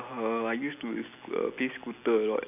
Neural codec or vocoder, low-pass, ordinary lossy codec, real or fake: none; 3.6 kHz; AAC, 32 kbps; real